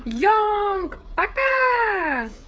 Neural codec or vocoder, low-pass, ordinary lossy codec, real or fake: codec, 16 kHz, 8 kbps, FreqCodec, smaller model; none; none; fake